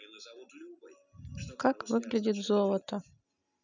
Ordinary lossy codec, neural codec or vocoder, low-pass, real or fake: none; none; 7.2 kHz; real